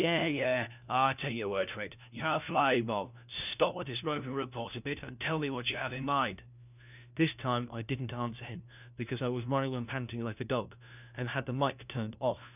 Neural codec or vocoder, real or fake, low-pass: codec, 16 kHz, 1 kbps, FunCodec, trained on LibriTTS, 50 frames a second; fake; 3.6 kHz